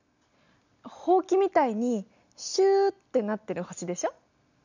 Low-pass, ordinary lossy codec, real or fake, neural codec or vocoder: 7.2 kHz; none; real; none